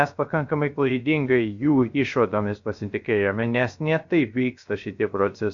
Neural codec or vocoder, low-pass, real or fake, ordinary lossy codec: codec, 16 kHz, 0.3 kbps, FocalCodec; 7.2 kHz; fake; MP3, 64 kbps